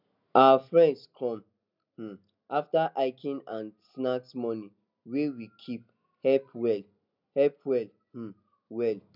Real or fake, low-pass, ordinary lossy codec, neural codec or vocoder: real; 5.4 kHz; none; none